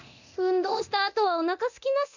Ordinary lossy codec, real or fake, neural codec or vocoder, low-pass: none; fake; codec, 24 kHz, 0.9 kbps, DualCodec; 7.2 kHz